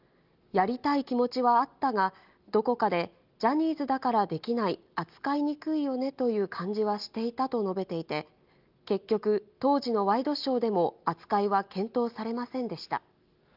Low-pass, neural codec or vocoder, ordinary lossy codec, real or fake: 5.4 kHz; none; Opus, 24 kbps; real